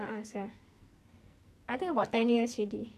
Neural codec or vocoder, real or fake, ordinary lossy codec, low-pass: codec, 44.1 kHz, 2.6 kbps, SNAC; fake; none; 14.4 kHz